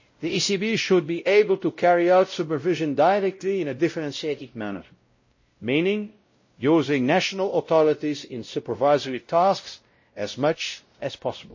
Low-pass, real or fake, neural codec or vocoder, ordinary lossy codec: 7.2 kHz; fake; codec, 16 kHz, 0.5 kbps, X-Codec, WavLM features, trained on Multilingual LibriSpeech; MP3, 32 kbps